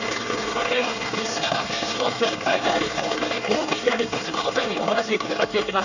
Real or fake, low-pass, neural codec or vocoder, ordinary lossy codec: fake; 7.2 kHz; codec, 24 kHz, 1 kbps, SNAC; none